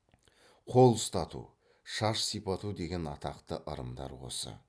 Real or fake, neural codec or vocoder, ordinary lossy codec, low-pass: real; none; none; none